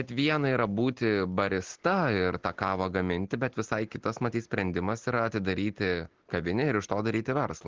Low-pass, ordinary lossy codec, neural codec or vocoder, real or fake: 7.2 kHz; Opus, 16 kbps; none; real